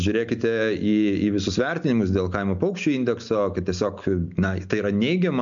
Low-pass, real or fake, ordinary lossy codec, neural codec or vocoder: 7.2 kHz; real; MP3, 64 kbps; none